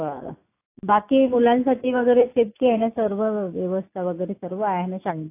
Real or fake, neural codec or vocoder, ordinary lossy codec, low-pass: real; none; AAC, 24 kbps; 3.6 kHz